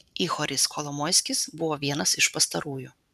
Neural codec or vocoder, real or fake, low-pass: vocoder, 44.1 kHz, 128 mel bands every 512 samples, BigVGAN v2; fake; 14.4 kHz